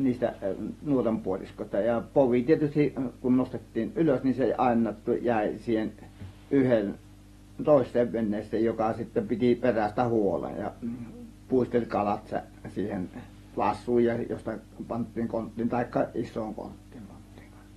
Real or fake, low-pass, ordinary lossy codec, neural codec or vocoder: real; 19.8 kHz; AAC, 32 kbps; none